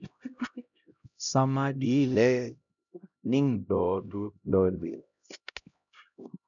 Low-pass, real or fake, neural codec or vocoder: 7.2 kHz; fake; codec, 16 kHz, 0.5 kbps, X-Codec, HuBERT features, trained on LibriSpeech